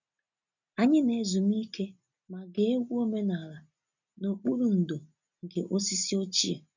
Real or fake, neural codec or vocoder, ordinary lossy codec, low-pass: real; none; none; 7.2 kHz